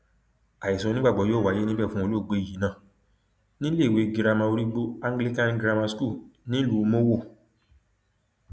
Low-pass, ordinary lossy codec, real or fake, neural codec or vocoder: none; none; real; none